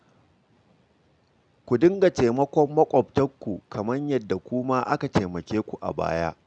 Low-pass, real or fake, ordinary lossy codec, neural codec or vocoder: 9.9 kHz; real; none; none